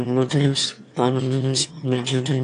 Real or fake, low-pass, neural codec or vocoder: fake; 9.9 kHz; autoencoder, 22.05 kHz, a latent of 192 numbers a frame, VITS, trained on one speaker